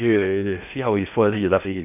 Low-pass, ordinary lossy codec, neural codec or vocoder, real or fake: 3.6 kHz; none; codec, 16 kHz in and 24 kHz out, 0.8 kbps, FocalCodec, streaming, 65536 codes; fake